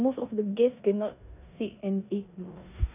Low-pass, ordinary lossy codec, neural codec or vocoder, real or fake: 3.6 kHz; none; codec, 16 kHz in and 24 kHz out, 0.9 kbps, LongCat-Audio-Codec, four codebook decoder; fake